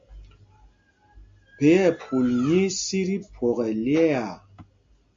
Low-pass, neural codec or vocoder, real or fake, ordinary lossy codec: 7.2 kHz; none; real; MP3, 48 kbps